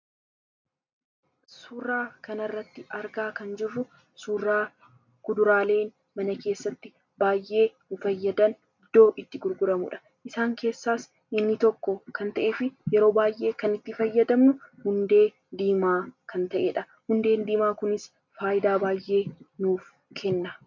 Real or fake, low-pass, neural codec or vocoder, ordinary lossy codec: real; 7.2 kHz; none; MP3, 64 kbps